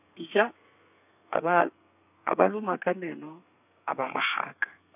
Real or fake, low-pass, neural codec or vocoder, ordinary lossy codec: fake; 3.6 kHz; codec, 32 kHz, 1.9 kbps, SNAC; none